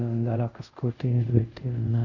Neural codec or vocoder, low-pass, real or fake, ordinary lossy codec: codec, 24 kHz, 0.5 kbps, DualCodec; 7.2 kHz; fake; none